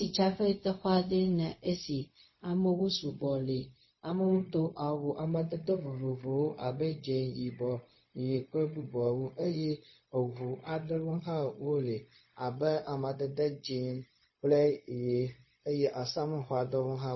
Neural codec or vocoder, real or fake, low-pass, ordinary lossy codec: codec, 16 kHz, 0.4 kbps, LongCat-Audio-Codec; fake; 7.2 kHz; MP3, 24 kbps